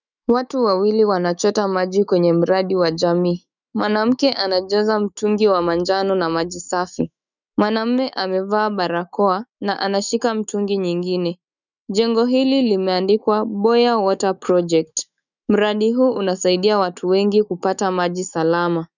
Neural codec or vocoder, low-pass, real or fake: autoencoder, 48 kHz, 128 numbers a frame, DAC-VAE, trained on Japanese speech; 7.2 kHz; fake